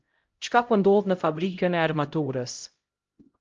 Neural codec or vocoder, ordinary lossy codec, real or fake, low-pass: codec, 16 kHz, 0.5 kbps, X-Codec, HuBERT features, trained on LibriSpeech; Opus, 32 kbps; fake; 7.2 kHz